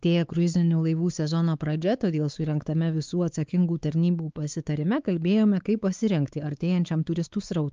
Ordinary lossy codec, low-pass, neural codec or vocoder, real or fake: Opus, 24 kbps; 7.2 kHz; codec, 16 kHz, 4 kbps, X-Codec, WavLM features, trained on Multilingual LibriSpeech; fake